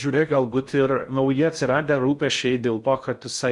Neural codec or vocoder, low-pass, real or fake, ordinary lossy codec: codec, 16 kHz in and 24 kHz out, 0.6 kbps, FocalCodec, streaming, 4096 codes; 10.8 kHz; fake; Opus, 64 kbps